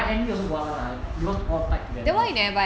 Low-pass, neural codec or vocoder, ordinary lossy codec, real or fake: none; none; none; real